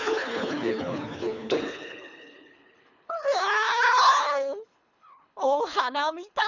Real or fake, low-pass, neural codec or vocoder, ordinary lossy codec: fake; 7.2 kHz; codec, 24 kHz, 3 kbps, HILCodec; none